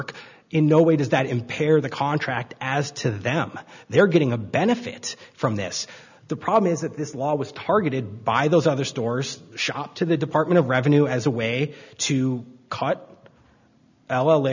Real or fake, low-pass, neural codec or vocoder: real; 7.2 kHz; none